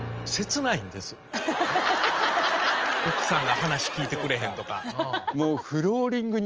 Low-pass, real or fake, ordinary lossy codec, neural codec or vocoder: 7.2 kHz; real; Opus, 24 kbps; none